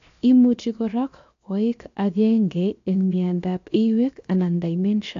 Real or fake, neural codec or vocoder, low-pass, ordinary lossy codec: fake; codec, 16 kHz, 0.7 kbps, FocalCodec; 7.2 kHz; AAC, 48 kbps